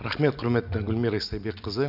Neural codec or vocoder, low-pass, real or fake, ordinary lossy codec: none; 5.4 kHz; real; none